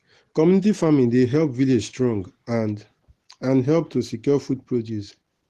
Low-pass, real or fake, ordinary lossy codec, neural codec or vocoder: 14.4 kHz; real; Opus, 16 kbps; none